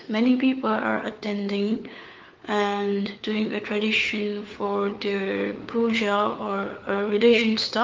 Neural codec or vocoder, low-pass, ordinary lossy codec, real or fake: codec, 16 kHz, 4 kbps, FunCodec, trained on LibriTTS, 50 frames a second; 7.2 kHz; Opus, 24 kbps; fake